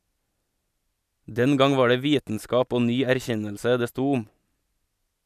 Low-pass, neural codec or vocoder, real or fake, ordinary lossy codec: 14.4 kHz; none; real; none